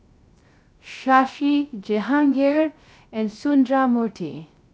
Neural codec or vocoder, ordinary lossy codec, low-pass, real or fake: codec, 16 kHz, 0.3 kbps, FocalCodec; none; none; fake